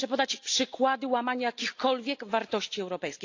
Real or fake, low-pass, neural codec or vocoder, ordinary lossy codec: real; 7.2 kHz; none; AAC, 48 kbps